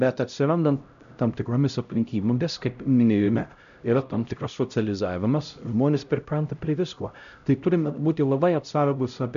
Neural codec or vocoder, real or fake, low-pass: codec, 16 kHz, 0.5 kbps, X-Codec, HuBERT features, trained on LibriSpeech; fake; 7.2 kHz